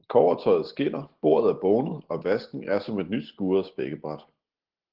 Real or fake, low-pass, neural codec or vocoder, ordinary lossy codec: real; 5.4 kHz; none; Opus, 16 kbps